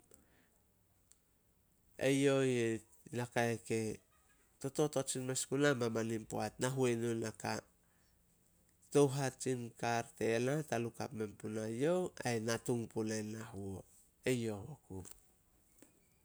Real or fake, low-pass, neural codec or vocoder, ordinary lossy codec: real; none; none; none